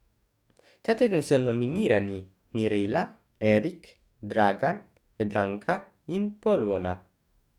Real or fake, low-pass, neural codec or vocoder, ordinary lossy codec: fake; 19.8 kHz; codec, 44.1 kHz, 2.6 kbps, DAC; none